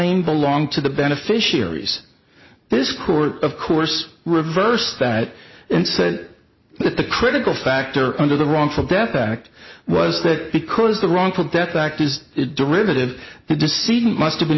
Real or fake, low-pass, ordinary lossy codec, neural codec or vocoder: real; 7.2 kHz; MP3, 24 kbps; none